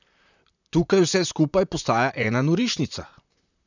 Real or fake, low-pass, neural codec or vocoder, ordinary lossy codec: fake; 7.2 kHz; vocoder, 44.1 kHz, 128 mel bands, Pupu-Vocoder; none